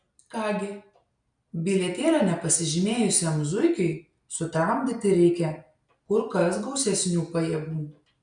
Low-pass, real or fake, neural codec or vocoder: 9.9 kHz; real; none